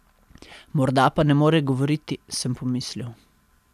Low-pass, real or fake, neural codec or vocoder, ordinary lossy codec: 14.4 kHz; real; none; none